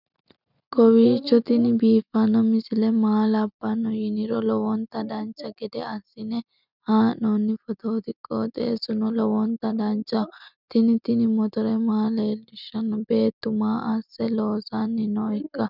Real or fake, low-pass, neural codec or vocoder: real; 5.4 kHz; none